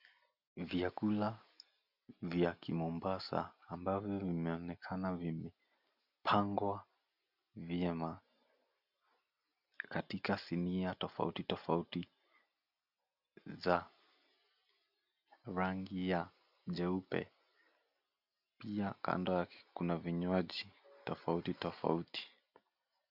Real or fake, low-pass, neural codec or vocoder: real; 5.4 kHz; none